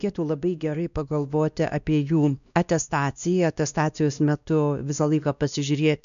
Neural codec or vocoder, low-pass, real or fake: codec, 16 kHz, 1 kbps, X-Codec, WavLM features, trained on Multilingual LibriSpeech; 7.2 kHz; fake